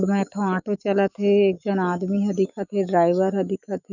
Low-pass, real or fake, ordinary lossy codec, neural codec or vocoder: 7.2 kHz; fake; none; autoencoder, 48 kHz, 128 numbers a frame, DAC-VAE, trained on Japanese speech